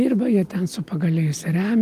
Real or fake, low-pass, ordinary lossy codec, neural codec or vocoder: real; 14.4 kHz; Opus, 24 kbps; none